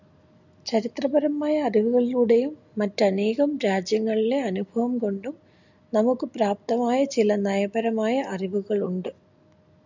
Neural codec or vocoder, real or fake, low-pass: none; real; 7.2 kHz